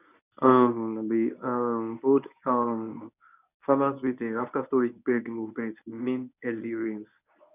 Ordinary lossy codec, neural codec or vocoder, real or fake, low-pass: none; codec, 24 kHz, 0.9 kbps, WavTokenizer, medium speech release version 1; fake; 3.6 kHz